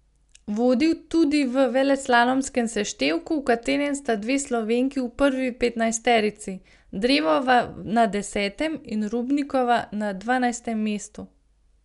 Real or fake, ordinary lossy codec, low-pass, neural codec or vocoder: real; MP3, 96 kbps; 10.8 kHz; none